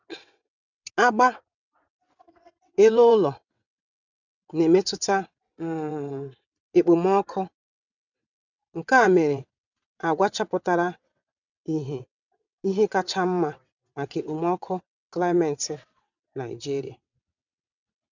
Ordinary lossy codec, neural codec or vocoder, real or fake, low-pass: none; vocoder, 22.05 kHz, 80 mel bands, WaveNeXt; fake; 7.2 kHz